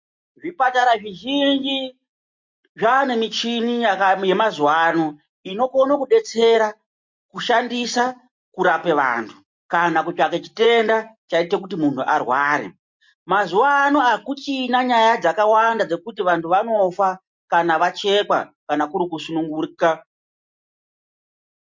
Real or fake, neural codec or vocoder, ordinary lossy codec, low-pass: real; none; MP3, 48 kbps; 7.2 kHz